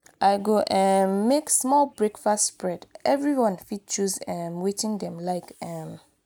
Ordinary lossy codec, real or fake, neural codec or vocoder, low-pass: none; real; none; none